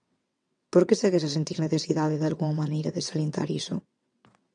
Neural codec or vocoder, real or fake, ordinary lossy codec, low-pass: vocoder, 22.05 kHz, 80 mel bands, WaveNeXt; fake; MP3, 96 kbps; 9.9 kHz